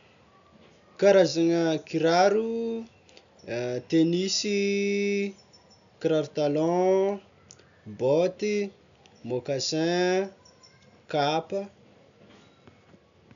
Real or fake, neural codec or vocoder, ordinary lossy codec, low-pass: real; none; none; 7.2 kHz